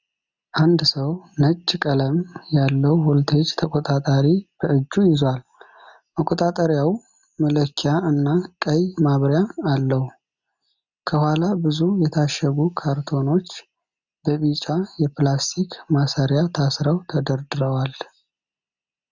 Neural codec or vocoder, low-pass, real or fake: none; 7.2 kHz; real